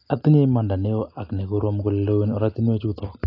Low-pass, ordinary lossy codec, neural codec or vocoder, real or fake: 5.4 kHz; AAC, 32 kbps; none; real